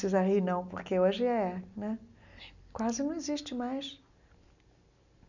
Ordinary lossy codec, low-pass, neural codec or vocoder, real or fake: none; 7.2 kHz; none; real